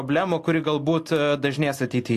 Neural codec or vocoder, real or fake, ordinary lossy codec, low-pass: none; real; AAC, 64 kbps; 14.4 kHz